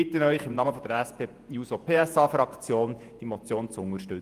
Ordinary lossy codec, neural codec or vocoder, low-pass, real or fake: Opus, 32 kbps; vocoder, 48 kHz, 128 mel bands, Vocos; 14.4 kHz; fake